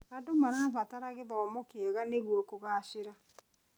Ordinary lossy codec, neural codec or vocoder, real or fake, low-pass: none; none; real; none